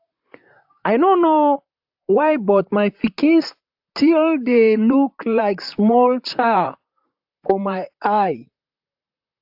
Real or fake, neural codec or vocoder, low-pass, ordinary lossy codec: fake; vocoder, 44.1 kHz, 128 mel bands, Pupu-Vocoder; 5.4 kHz; AAC, 48 kbps